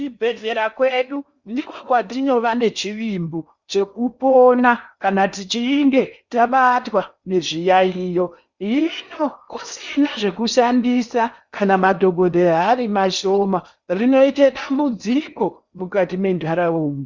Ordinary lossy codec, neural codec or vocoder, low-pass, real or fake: Opus, 64 kbps; codec, 16 kHz in and 24 kHz out, 0.8 kbps, FocalCodec, streaming, 65536 codes; 7.2 kHz; fake